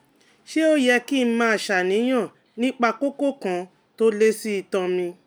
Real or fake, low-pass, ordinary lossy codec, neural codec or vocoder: real; none; none; none